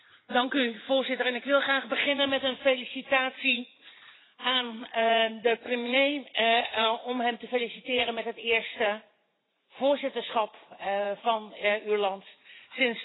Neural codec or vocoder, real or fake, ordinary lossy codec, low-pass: vocoder, 44.1 kHz, 80 mel bands, Vocos; fake; AAC, 16 kbps; 7.2 kHz